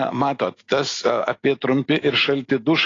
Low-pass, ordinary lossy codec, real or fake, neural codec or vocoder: 7.2 kHz; AAC, 32 kbps; real; none